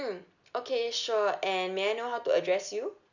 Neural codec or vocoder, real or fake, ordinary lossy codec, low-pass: none; real; none; 7.2 kHz